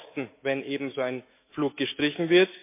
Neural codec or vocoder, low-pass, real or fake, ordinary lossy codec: autoencoder, 48 kHz, 128 numbers a frame, DAC-VAE, trained on Japanese speech; 3.6 kHz; fake; MP3, 24 kbps